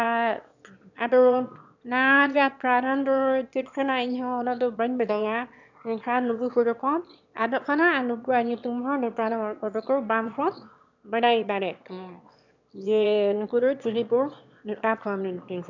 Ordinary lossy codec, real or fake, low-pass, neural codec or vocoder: none; fake; 7.2 kHz; autoencoder, 22.05 kHz, a latent of 192 numbers a frame, VITS, trained on one speaker